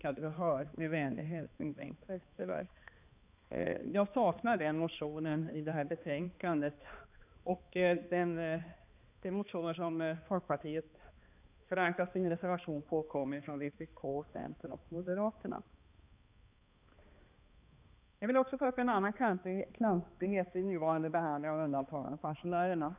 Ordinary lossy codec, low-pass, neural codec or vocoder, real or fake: none; 3.6 kHz; codec, 16 kHz, 2 kbps, X-Codec, HuBERT features, trained on balanced general audio; fake